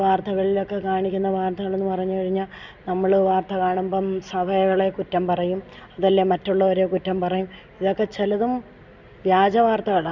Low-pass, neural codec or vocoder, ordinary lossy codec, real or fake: 7.2 kHz; none; Opus, 64 kbps; real